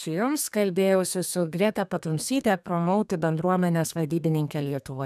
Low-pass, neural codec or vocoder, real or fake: 14.4 kHz; codec, 32 kHz, 1.9 kbps, SNAC; fake